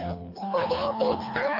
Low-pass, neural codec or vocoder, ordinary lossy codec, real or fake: 5.4 kHz; codec, 24 kHz, 3 kbps, HILCodec; AAC, 32 kbps; fake